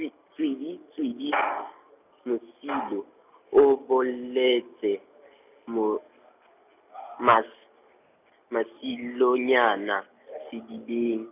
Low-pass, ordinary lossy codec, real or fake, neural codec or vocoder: 3.6 kHz; none; real; none